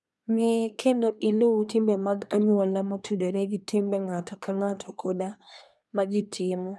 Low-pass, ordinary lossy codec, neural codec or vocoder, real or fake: none; none; codec, 24 kHz, 1 kbps, SNAC; fake